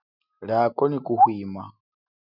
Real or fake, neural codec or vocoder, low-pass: real; none; 5.4 kHz